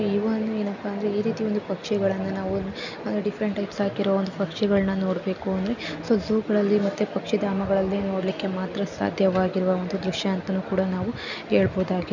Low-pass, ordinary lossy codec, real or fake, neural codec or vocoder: 7.2 kHz; none; real; none